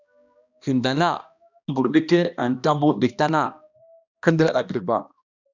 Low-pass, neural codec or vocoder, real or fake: 7.2 kHz; codec, 16 kHz, 1 kbps, X-Codec, HuBERT features, trained on balanced general audio; fake